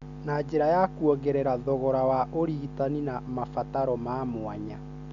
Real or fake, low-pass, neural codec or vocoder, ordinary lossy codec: real; 7.2 kHz; none; none